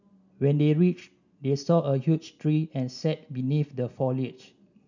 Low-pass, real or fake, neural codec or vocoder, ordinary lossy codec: 7.2 kHz; real; none; none